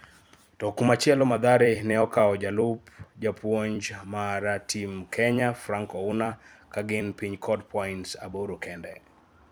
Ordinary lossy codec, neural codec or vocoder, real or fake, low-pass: none; vocoder, 44.1 kHz, 128 mel bands every 256 samples, BigVGAN v2; fake; none